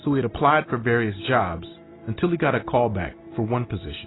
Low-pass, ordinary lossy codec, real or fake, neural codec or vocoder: 7.2 kHz; AAC, 16 kbps; real; none